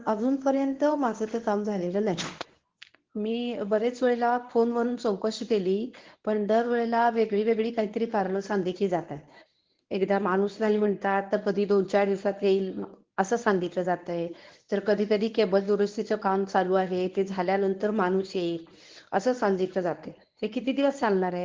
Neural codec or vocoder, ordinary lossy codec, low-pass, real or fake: codec, 24 kHz, 0.9 kbps, WavTokenizer, medium speech release version 1; Opus, 16 kbps; 7.2 kHz; fake